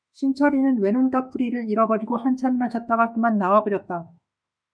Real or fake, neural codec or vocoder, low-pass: fake; autoencoder, 48 kHz, 32 numbers a frame, DAC-VAE, trained on Japanese speech; 9.9 kHz